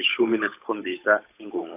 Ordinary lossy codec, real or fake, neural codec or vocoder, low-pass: AAC, 32 kbps; fake; codec, 16 kHz, 8 kbps, FunCodec, trained on Chinese and English, 25 frames a second; 3.6 kHz